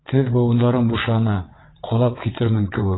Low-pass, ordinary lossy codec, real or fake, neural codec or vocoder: 7.2 kHz; AAC, 16 kbps; fake; vocoder, 22.05 kHz, 80 mel bands, WaveNeXt